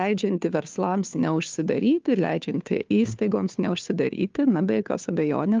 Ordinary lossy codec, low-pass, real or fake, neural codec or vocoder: Opus, 24 kbps; 7.2 kHz; fake; codec, 16 kHz, 2 kbps, FunCodec, trained on Chinese and English, 25 frames a second